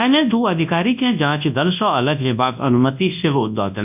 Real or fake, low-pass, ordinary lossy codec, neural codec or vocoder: fake; 3.6 kHz; none; codec, 24 kHz, 0.9 kbps, WavTokenizer, large speech release